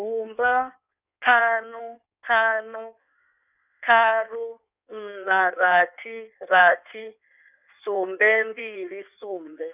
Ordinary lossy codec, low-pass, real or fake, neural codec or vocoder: none; 3.6 kHz; fake; codec, 16 kHz in and 24 kHz out, 1.1 kbps, FireRedTTS-2 codec